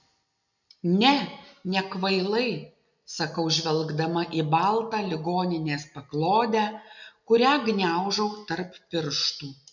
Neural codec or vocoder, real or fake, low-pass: none; real; 7.2 kHz